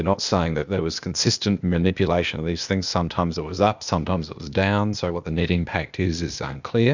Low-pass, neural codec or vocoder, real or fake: 7.2 kHz; codec, 16 kHz, 0.8 kbps, ZipCodec; fake